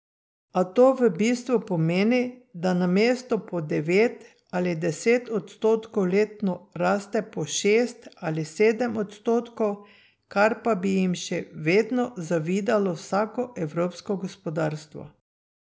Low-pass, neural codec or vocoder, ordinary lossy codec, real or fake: none; none; none; real